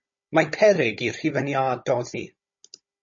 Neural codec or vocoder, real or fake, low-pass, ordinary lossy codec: codec, 16 kHz, 16 kbps, FunCodec, trained on Chinese and English, 50 frames a second; fake; 7.2 kHz; MP3, 32 kbps